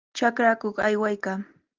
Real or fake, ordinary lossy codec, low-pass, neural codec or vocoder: real; Opus, 24 kbps; 7.2 kHz; none